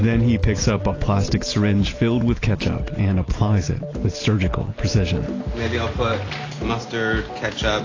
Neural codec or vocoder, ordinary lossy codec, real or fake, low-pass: none; AAC, 32 kbps; real; 7.2 kHz